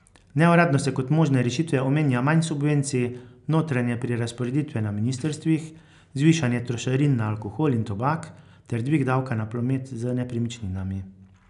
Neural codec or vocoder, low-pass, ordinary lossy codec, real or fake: none; 10.8 kHz; none; real